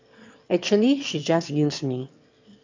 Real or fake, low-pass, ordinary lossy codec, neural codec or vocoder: fake; 7.2 kHz; MP3, 64 kbps; autoencoder, 22.05 kHz, a latent of 192 numbers a frame, VITS, trained on one speaker